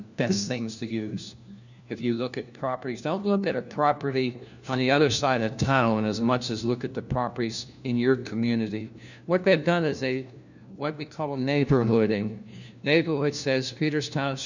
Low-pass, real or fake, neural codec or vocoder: 7.2 kHz; fake; codec, 16 kHz, 1 kbps, FunCodec, trained on LibriTTS, 50 frames a second